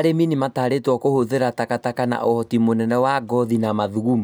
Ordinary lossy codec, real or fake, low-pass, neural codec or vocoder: none; real; none; none